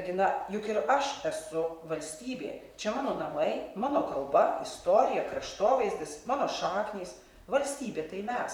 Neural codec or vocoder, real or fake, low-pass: vocoder, 44.1 kHz, 128 mel bands, Pupu-Vocoder; fake; 19.8 kHz